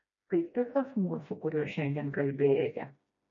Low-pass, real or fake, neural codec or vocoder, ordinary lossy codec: 7.2 kHz; fake; codec, 16 kHz, 1 kbps, FreqCodec, smaller model; MP3, 48 kbps